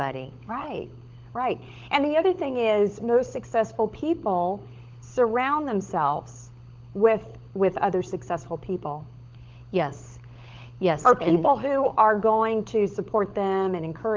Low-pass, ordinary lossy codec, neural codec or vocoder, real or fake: 7.2 kHz; Opus, 32 kbps; codec, 16 kHz, 16 kbps, FunCodec, trained on LibriTTS, 50 frames a second; fake